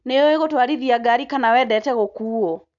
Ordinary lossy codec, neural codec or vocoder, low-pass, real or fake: none; none; 7.2 kHz; real